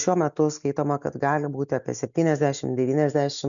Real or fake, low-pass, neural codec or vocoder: real; 7.2 kHz; none